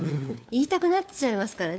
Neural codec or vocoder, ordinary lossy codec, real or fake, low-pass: codec, 16 kHz, 4 kbps, FunCodec, trained on LibriTTS, 50 frames a second; none; fake; none